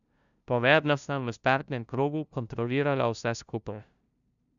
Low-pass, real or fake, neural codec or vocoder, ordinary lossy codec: 7.2 kHz; fake; codec, 16 kHz, 0.5 kbps, FunCodec, trained on LibriTTS, 25 frames a second; none